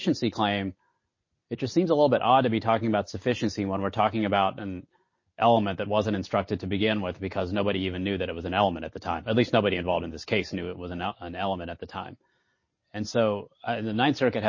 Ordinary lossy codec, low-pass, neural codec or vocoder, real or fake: MP3, 32 kbps; 7.2 kHz; none; real